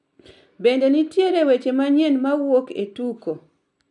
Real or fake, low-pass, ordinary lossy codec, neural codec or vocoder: real; 10.8 kHz; none; none